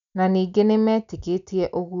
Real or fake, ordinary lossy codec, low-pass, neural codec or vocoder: real; none; 7.2 kHz; none